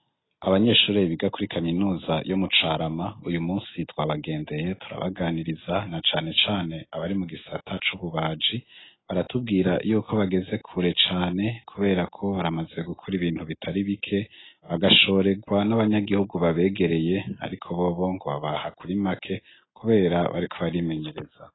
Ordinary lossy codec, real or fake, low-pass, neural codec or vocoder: AAC, 16 kbps; real; 7.2 kHz; none